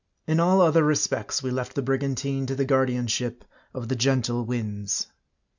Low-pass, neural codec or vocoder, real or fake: 7.2 kHz; none; real